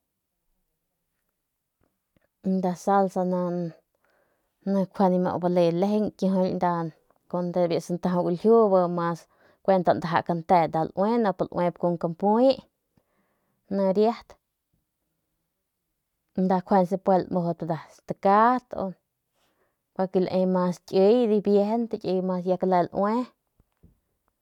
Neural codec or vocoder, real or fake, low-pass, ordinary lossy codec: none; real; 19.8 kHz; none